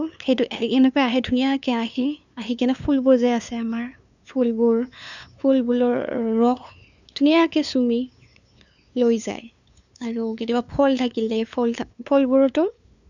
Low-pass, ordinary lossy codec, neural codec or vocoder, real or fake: 7.2 kHz; none; codec, 16 kHz, 2 kbps, FunCodec, trained on LibriTTS, 25 frames a second; fake